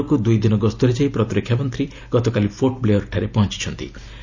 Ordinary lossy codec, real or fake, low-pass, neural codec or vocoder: none; real; 7.2 kHz; none